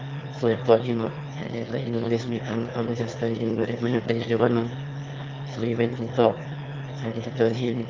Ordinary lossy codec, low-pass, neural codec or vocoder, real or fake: Opus, 24 kbps; 7.2 kHz; autoencoder, 22.05 kHz, a latent of 192 numbers a frame, VITS, trained on one speaker; fake